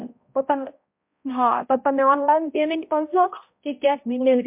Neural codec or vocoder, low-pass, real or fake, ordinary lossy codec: codec, 16 kHz, 0.5 kbps, X-Codec, HuBERT features, trained on balanced general audio; 3.6 kHz; fake; MP3, 32 kbps